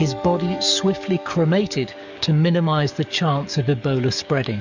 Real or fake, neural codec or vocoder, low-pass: fake; codec, 44.1 kHz, 7.8 kbps, DAC; 7.2 kHz